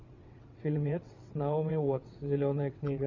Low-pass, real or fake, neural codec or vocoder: 7.2 kHz; fake; vocoder, 22.05 kHz, 80 mel bands, WaveNeXt